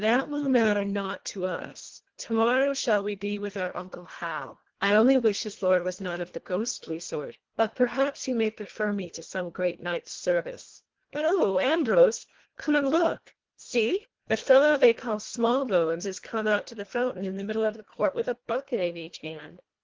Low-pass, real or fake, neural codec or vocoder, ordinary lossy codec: 7.2 kHz; fake; codec, 24 kHz, 1.5 kbps, HILCodec; Opus, 16 kbps